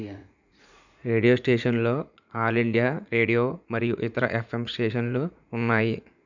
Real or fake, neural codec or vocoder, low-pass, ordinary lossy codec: fake; vocoder, 44.1 kHz, 80 mel bands, Vocos; 7.2 kHz; none